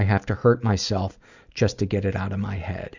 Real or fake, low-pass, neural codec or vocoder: real; 7.2 kHz; none